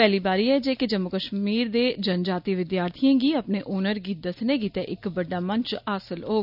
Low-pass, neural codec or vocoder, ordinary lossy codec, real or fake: 5.4 kHz; none; none; real